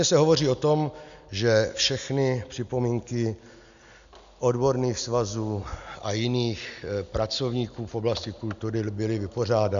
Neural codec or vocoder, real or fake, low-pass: none; real; 7.2 kHz